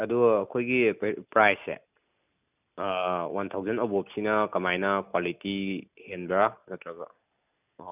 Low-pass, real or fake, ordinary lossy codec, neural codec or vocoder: 3.6 kHz; real; none; none